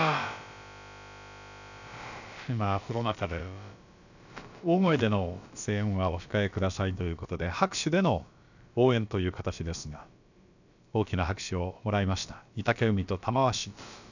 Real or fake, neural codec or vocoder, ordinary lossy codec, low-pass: fake; codec, 16 kHz, about 1 kbps, DyCAST, with the encoder's durations; none; 7.2 kHz